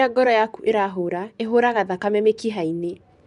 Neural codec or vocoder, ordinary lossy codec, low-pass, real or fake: vocoder, 24 kHz, 100 mel bands, Vocos; none; 10.8 kHz; fake